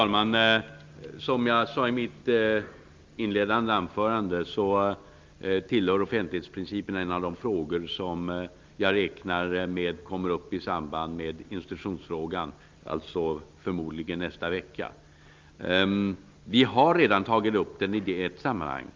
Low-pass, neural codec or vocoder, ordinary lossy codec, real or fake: 7.2 kHz; none; Opus, 32 kbps; real